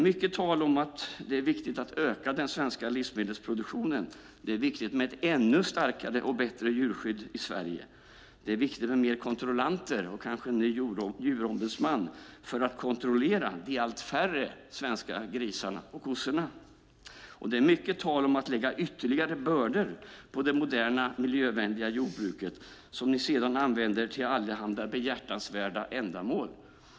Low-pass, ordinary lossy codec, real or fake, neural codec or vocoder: none; none; real; none